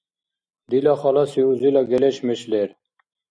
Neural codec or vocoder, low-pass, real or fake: none; 9.9 kHz; real